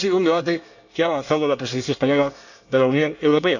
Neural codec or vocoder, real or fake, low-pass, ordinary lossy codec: codec, 24 kHz, 1 kbps, SNAC; fake; 7.2 kHz; none